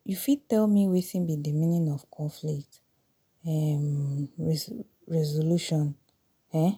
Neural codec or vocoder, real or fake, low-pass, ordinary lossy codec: none; real; none; none